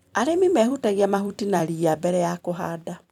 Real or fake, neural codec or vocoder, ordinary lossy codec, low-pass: fake; vocoder, 44.1 kHz, 128 mel bands every 256 samples, BigVGAN v2; none; 19.8 kHz